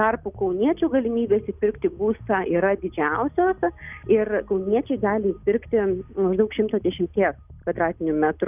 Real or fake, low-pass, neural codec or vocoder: real; 3.6 kHz; none